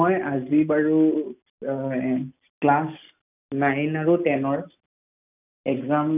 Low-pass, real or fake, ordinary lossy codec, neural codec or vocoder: 3.6 kHz; real; none; none